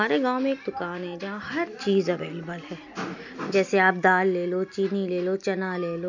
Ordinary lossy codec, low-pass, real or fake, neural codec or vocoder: none; 7.2 kHz; real; none